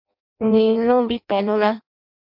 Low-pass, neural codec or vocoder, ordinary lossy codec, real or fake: 5.4 kHz; codec, 16 kHz in and 24 kHz out, 0.6 kbps, FireRedTTS-2 codec; MP3, 48 kbps; fake